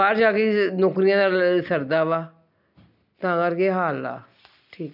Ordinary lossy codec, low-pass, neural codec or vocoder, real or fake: none; 5.4 kHz; none; real